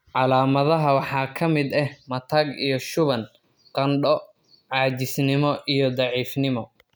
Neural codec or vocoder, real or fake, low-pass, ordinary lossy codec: none; real; none; none